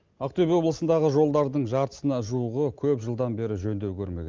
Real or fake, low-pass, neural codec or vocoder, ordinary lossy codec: real; 7.2 kHz; none; Opus, 32 kbps